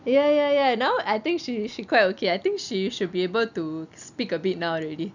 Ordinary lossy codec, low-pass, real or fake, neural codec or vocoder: none; 7.2 kHz; real; none